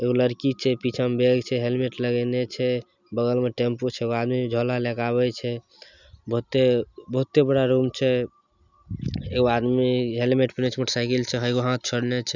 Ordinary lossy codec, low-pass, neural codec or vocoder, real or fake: none; 7.2 kHz; none; real